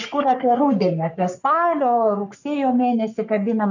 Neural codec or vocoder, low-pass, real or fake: codec, 44.1 kHz, 3.4 kbps, Pupu-Codec; 7.2 kHz; fake